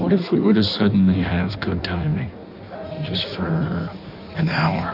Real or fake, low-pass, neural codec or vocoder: fake; 5.4 kHz; codec, 16 kHz in and 24 kHz out, 1.1 kbps, FireRedTTS-2 codec